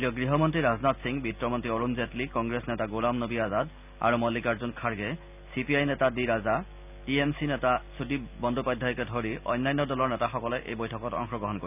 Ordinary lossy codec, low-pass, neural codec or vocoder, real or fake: none; 3.6 kHz; none; real